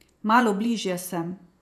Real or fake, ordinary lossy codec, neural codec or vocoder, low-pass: fake; none; vocoder, 48 kHz, 128 mel bands, Vocos; 14.4 kHz